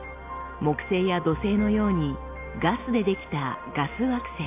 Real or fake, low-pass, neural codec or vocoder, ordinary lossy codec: real; 3.6 kHz; none; AAC, 24 kbps